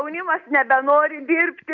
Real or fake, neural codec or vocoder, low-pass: real; none; 7.2 kHz